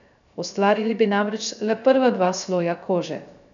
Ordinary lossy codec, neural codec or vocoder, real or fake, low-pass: none; codec, 16 kHz, 0.3 kbps, FocalCodec; fake; 7.2 kHz